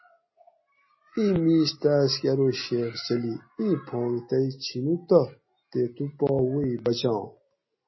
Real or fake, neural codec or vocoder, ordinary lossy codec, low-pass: real; none; MP3, 24 kbps; 7.2 kHz